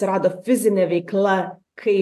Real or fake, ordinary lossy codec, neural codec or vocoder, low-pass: real; AAC, 64 kbps; none; 14.4 kHz